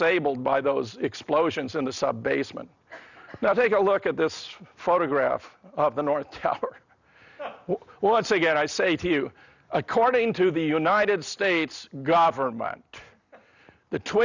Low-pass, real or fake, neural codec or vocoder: 7.2 kHz; real; none